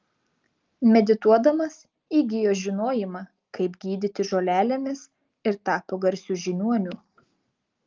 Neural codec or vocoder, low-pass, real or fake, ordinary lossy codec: none; 7.2 kHz; real; Opus, 32 kbps